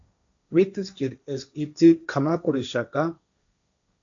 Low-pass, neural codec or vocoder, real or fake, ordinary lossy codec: 7.2 kHz; codec, 16 kHz, 1.1 kbps, Voila-Tokenizer; fake; MP3, 64 kbps